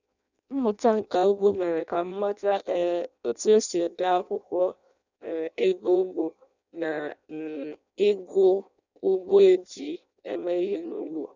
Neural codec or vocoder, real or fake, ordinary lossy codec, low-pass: codec, 16 kHz in and 24 kHz out, 0.6 kbps, FireRedTTS-2 codec; fake; none; 7.2 kHz